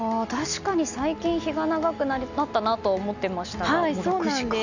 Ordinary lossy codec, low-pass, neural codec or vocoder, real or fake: none; 7.2 kHz; none; real